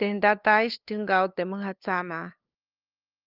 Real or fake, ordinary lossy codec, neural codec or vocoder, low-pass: fake; Opus, 32 kbps; codec, 16 kHz, 2 kbps, FunCodec, trained on LibriTTS, 25 frames a second; 5.4 kHz